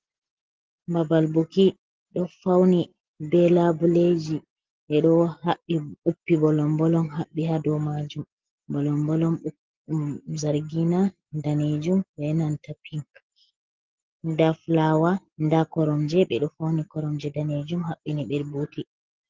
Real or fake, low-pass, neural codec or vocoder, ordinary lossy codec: real; 7.2 kHz; none; Opus, 16 kbps